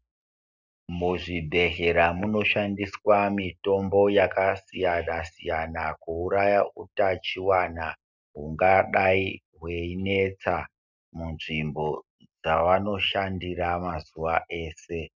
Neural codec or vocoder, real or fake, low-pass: none; real; 7.2 kHz